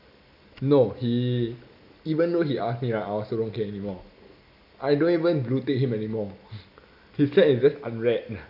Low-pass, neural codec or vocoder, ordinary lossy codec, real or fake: 5.4 kHz; none; none; real